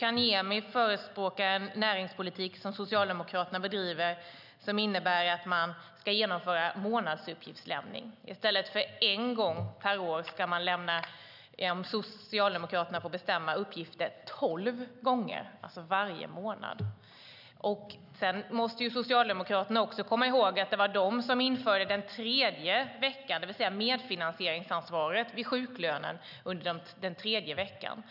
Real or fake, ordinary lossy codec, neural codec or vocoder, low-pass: real; none; none; 5.4 kHz